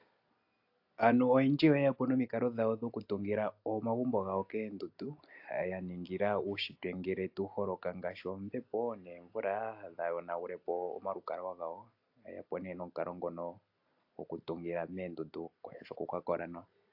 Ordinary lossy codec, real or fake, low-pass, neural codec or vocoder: AAC, 48 kbps; real; 5.4 kHz; none